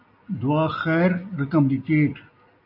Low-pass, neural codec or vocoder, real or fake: 5.4 kHz; none; real